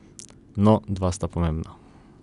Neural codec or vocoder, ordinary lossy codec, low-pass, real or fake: none; none; 10.8 kHz; real